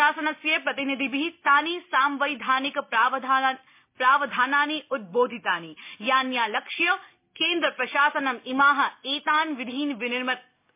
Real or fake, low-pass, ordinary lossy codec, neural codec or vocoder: real; 3.6 kHz; MP3, 24 kbps; none